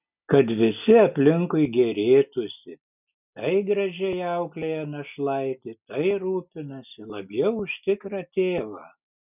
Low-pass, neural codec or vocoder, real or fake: 3.6 kHz; none; real